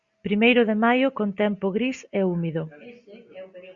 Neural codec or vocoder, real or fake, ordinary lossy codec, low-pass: none; real; Opus, 64 kbps; 7.2 kHz